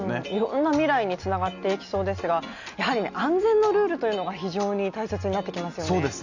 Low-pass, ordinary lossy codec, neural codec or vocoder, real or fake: 7.2 kHz; none; none; real